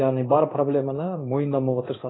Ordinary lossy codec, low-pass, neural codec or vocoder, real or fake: AAC, 16 kbps; 7.2 kHz; codec, 16 kHz in and 24 kHz out, 1 kbps, XY-Tokenizer; fake